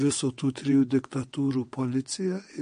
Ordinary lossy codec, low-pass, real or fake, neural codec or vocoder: MP3, 48 kbps; 9.9 kHz; fake; vocoder, 22.05 kHz, 80 mel bands, WaveNeXt